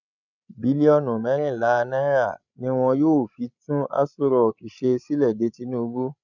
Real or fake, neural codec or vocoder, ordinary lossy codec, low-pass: fake; codec, 16 kHz, 16 kbps, FreqCodec, larger model; none; 7.2 kHz